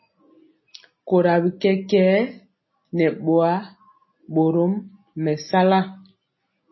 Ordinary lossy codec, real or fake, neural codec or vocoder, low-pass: MP3, 24 kbps; real; none; 7.2 kHz